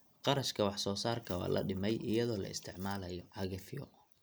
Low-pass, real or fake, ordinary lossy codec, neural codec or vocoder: none; real; none; none